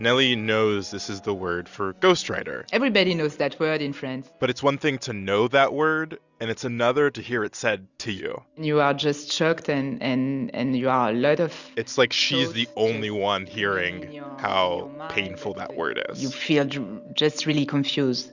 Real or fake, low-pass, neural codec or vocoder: real; 7.2 kHz; none